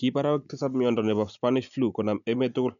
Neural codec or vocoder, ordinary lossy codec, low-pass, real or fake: none; none; 7.2 kHz; real